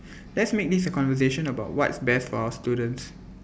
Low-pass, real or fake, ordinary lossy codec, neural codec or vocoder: none; real; none; none